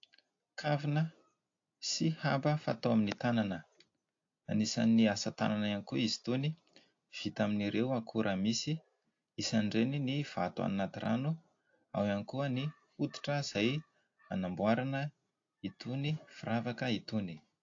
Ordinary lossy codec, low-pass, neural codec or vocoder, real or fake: AAC, 48 kbps; 7.2 kHz; none; real